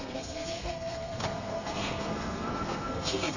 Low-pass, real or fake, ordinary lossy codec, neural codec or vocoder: 7.2 kHz; fake; none; codec, 32 kHz, 1.9 kbps, SNAC